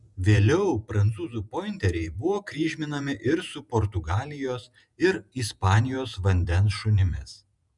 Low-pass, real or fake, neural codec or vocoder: 10.8 kHz; fake; vocoder, 48 kHz, 128 mel bands, Vocos